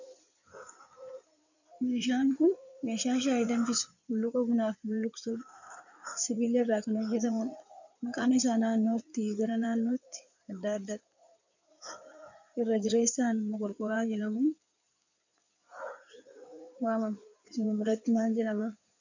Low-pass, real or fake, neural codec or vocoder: 7.2 kHz; fake; codec, 16 kHz in and 24 kHz out, 2.2 kbps, FireRedTTS-2 codec